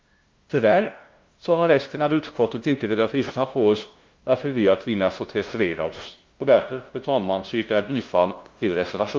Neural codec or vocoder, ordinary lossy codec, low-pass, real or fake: codec, 16 kHz, 0.5 kbps, FunCodec, trained on LibriTTS, 25 frames a second; Opus, 32 kbps; 7.2 kHz; fake